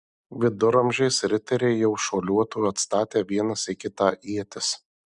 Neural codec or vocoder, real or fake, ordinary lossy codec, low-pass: none; real; Opus, 64 kbps; 9.9 kHz